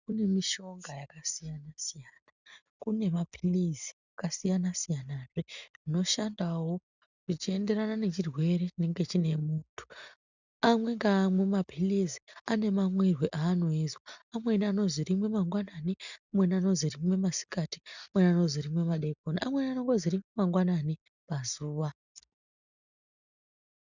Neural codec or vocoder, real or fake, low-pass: none; real; 7.2 kHz